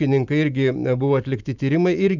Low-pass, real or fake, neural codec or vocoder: 7.2 kHz; fake; vocoder, 44.1 kHz, 128 mel bands every 512 samples, BigVGAN v2